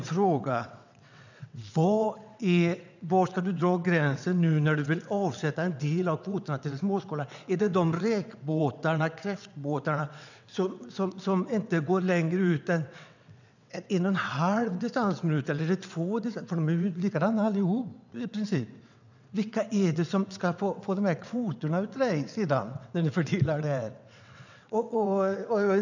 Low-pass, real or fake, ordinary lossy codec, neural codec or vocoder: 7.2 kHz; fake; none; vocoder, 22.05 kHz, 80 mel bands, WaveNeXt